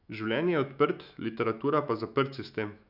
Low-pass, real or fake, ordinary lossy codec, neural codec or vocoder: 5.4 kHz; fake; none; autoencoder, 48 kHz, 128 numbers a frame, DAC-VAE, trained on Japanese speech